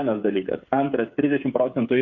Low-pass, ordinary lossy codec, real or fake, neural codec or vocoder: 7.2 kHz; Opus, 64 kbps; fake; codec, 16 kHz, 8 kbps, FreqCodec, smaller model